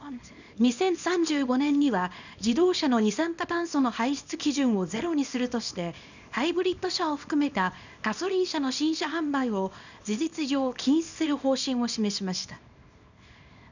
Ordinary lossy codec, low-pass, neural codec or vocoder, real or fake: none; 7.2 kHz; codec, 24 kHz, 0.9 kbps, WavTokenizer, small release; fake